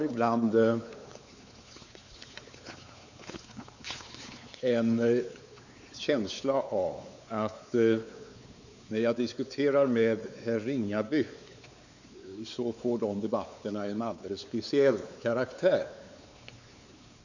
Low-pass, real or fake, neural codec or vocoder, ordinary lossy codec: 7.2 kHz; fake; codec, 16 kHz, 4 kbps, X-Codec, WavLM features, trained on Multilingual LibriSpeech; none